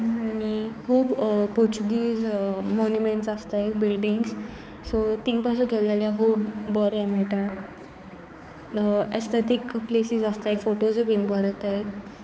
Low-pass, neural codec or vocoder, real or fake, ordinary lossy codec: none; codec, 16 kHz, 4 kbps, X-Codec, HuBERT features, trained on balanced general audio; fake; none